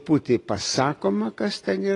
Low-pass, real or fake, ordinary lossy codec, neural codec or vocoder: 10.8 kHz; real; AAC, 32 kbps; none